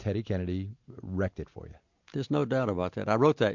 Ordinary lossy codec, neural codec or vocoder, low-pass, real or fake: MP3, 64 kbps; none; 7.2 kHz; real